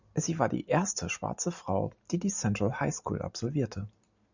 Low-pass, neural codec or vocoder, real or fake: 7.2 kHz; none; real